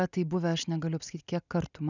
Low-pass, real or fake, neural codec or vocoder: 7.2 kHz; real; none